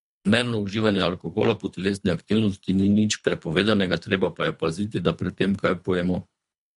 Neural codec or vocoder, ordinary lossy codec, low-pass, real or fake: codec, 24 kHz, 3 kbps, HILCodec; MP3, 64 kbps; 10.8 kHz; fake